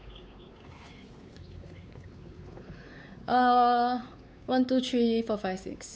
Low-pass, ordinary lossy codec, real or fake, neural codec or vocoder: none; none; fake; codec, 16 kHz, 4 kbps, X-Codec, WavLM features, trained on Multilingual LibriSpeech